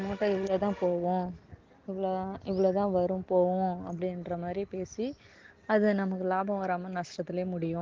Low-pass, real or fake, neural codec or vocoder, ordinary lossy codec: 7.2 kHz; real; none; Opus, 16 kbps